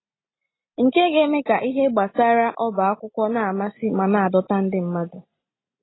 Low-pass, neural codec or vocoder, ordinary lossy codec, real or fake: 7.2 kHz; none; AAC, 16 kbps; real